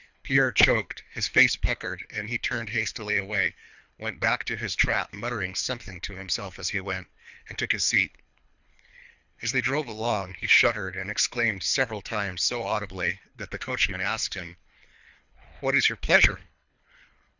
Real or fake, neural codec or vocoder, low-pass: fake; codec, 24 kHz, 3 kbps, HILCodec; 7.2 kHz